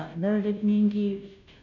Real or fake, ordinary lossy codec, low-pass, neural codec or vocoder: fake; none; 7.2 kHz; codec, 16 kHz, 0.5 kbps, FunCodec, trained on Chinese and English, 25 frames a second